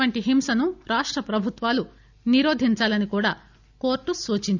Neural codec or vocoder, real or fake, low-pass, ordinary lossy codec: none; real; 7.2 kHz; none